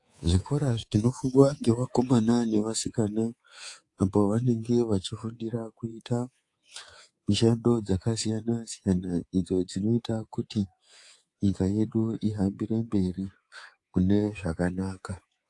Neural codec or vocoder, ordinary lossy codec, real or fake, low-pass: codec, 24 kHz, 3.1 kbps, DualCodec; AAC, 48 kbps; fake; 10.8 kHz